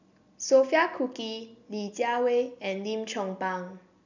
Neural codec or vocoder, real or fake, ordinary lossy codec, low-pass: none; real; none; 7.2 kHz